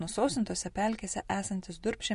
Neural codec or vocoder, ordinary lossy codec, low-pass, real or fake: none; MP3, 48 kbps; 14.4 kHz; real